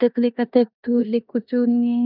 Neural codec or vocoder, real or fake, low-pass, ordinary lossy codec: codec, 16 kHz in and 24 kHz out, 0.9 kbps, LongCat-Audio-Codec, four codebook decoder; fake; 5.4 kHz; none